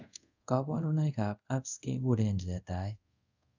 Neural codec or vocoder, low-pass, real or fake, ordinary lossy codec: codec, 24 kHz, 0.5 kbps, DualCodec; 7.2 kHz; fake; none